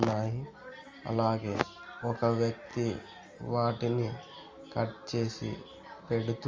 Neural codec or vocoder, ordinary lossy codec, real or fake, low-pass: none; Opus, 32 kbps; real; 7.2 kHz